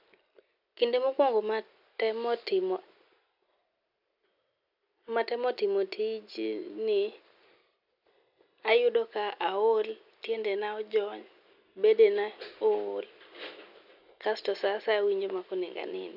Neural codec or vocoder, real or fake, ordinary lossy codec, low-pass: none; real; none; 5.4 kHz